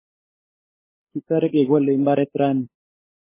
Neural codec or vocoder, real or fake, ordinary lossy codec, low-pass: codec, 16 kHz, 8 kbps, FunCodec, trained on LibriTTS, 25 frames a second; fake; MP3, 16 kbps; 3.6 kHz